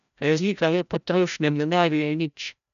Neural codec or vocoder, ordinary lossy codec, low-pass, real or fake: codec, 16 kHz, 0.5 kbps, FreqCodec, larger model; none; 7.2 kHz; fake